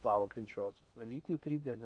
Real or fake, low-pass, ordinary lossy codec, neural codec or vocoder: fake; 9.9 kHz; AAC, 64 kbps; codec, 16 kHz in and 24 kHz out, 0.8 kbps, FocalCodec, streaming, 65536 codes